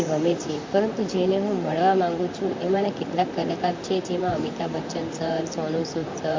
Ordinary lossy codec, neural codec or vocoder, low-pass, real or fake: MP3, 48 kbps; vocoder, 44.1 kHz, 128 mel bands, Pupu-Vocoder; 7.2 kHz; fake